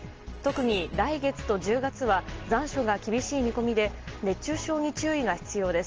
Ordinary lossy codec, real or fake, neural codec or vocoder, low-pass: Opus, 16 kbps; real; none; 7.2 kHz